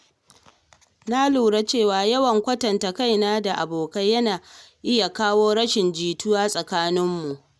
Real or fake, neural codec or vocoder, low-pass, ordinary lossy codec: real; none; none; none